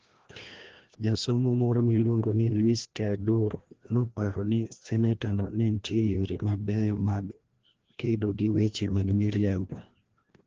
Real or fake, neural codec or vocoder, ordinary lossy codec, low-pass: fake; codec, 16 kHz, 1 kbps, FreqCodec, larger model; Opus, 16 kbps; 7.2 kHz